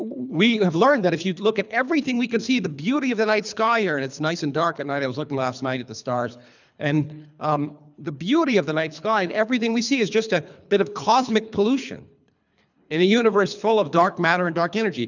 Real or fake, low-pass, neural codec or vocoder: fake; 7.2 kHz; codec, 24 kHz, 3 kbps, HILCodec